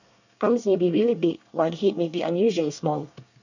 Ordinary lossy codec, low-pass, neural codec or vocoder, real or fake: none; 7.2 kHz; codec, 24 kHz, 1 kbps, SNAC; fake